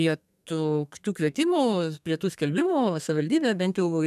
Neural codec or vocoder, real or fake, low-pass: codec, 32 kHz, 1.9 kbps, SNAC; fake; 14.4 kHz